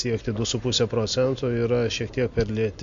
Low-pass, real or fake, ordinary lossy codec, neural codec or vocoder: 7.2 kHz; real; MP3, 48 kbps; none